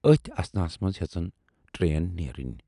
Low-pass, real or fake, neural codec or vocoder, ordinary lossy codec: 10.8 kHz; real; none; none